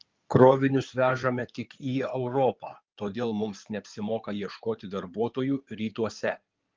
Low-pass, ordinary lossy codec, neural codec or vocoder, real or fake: 7.2 kHz; Opus, 32 kbps; codec, 16 kHz in and 24 kHz out, 2.2 kbps, FireRedTTS-2 codec; fake